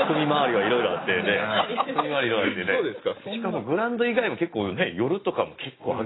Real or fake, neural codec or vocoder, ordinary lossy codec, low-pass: real; none; AAC, 16 kbps; 7.2 kHz